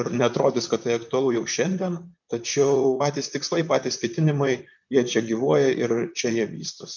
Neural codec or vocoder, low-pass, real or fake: vocoder, 44.1 kHz, 128 mel bands, Pupu-Vocoder; 7.2 kHz; fake